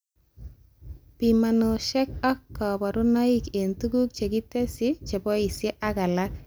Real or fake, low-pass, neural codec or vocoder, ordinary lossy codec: real; none; none; none